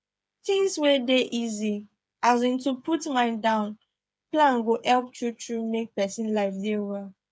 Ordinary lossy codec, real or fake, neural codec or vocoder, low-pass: none; fake; codec, 16 kHz, 8 kbps, FreqCodec, smaller model; none